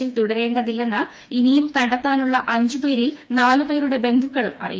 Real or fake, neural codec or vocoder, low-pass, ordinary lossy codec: fake; codec, 16 kHz, 2 kbps, FreqCodec, smaller model; none; none